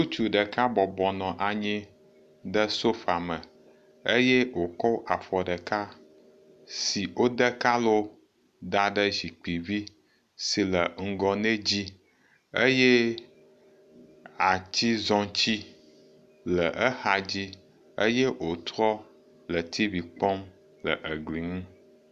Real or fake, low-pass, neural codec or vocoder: real; 14.4 kHz; none